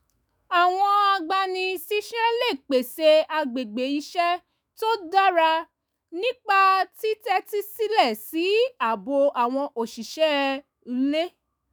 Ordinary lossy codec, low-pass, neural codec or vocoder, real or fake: none; none; autoencoder, 48 kHz, 128 numbers a frame, DAC-VAE, trained on Japanese speech; fake